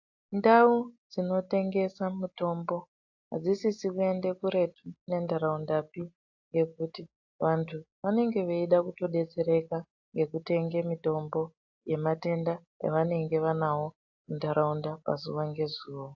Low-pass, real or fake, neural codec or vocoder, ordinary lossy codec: 7.2 kHz; real; none; AAC, 48 kbps